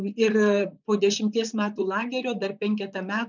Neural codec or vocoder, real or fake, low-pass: vocoder, 44.1 kHz, 128 mel bands, Pupu-Vocoder; fake; 7.2 kHz